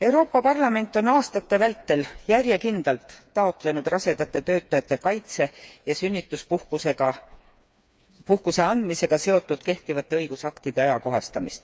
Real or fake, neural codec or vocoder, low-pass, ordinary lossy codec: fake; codec, 16 kHz, 4 kbps, FreqCodec, smaller model; none; none